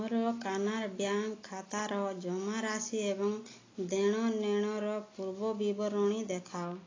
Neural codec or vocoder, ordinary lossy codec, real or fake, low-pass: none; AAC, 32 kbps; real; 7.2 kHz